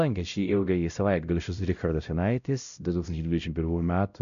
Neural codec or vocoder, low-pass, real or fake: codec, 16 kHz, 0.5 kbps, X-Codec, WavLM features, trained on Multilingual LibriSpeech; 7.2 kHz; fake